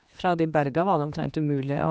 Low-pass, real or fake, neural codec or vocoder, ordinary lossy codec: none; fake; codec, 16 kHz, 2 kbps, X-Codec, HuBERT features, trained on general audio; none